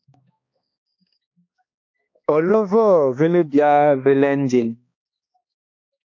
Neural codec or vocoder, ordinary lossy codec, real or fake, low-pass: codec, 16 kHz, 2 kbps, X-Codec, HuBERT features, trained on balanced general audio; AAC, 48 kbps; fake; 7.2 kHz